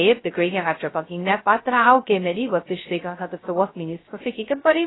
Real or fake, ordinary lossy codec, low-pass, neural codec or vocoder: fake; AAC, 16 kbps; 7.2 kHz; codec, 16 kHz, 0.2 kbps, FocalCodec